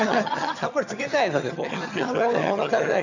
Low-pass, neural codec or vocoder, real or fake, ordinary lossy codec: 7.2 kHz; vocoder, 22.05 kHz, 80 mel bands, HiFi-GAN; fake; none